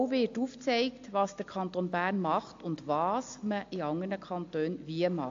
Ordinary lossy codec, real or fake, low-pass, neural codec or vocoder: AAC, 48 kbps; real; 7.2 kHz; none